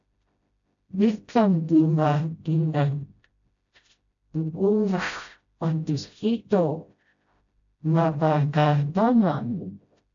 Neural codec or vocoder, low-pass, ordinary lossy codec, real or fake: codec, 16 kHz, 0.5 kbps, FreqCodec, smaller model; 7.2 kHz; AAC, 64 kbps; fake